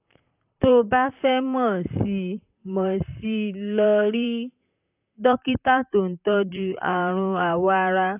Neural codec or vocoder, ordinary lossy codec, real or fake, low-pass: vocoder, 44.1 kHz, 128 mel bands, Pupu-Vocoder; AAC, 32 kbps; fake; 3.6 kHz